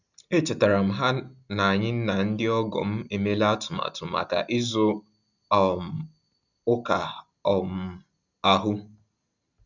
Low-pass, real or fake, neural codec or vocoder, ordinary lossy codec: 7.2 kHz; real; none; none